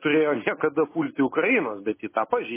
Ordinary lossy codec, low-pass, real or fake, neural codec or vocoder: MP3, 16 kbps; 3.6 kHz; real; none